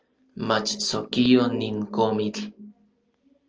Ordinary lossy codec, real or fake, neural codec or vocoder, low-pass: Opus, 24 kbps; real; none; 7.2 kHz